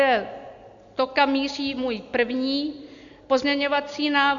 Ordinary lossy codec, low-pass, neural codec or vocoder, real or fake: Opus, 64 kbps; 7.2 kHz; none; real